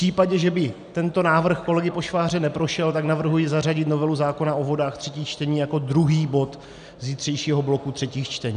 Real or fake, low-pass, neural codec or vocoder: real; 9.9 kHz; none